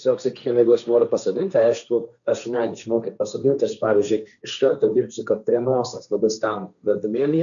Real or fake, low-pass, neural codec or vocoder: fake; 7.2 kHz; codec, 16 kHz, 1.1 kbps, Voila-Tokenizer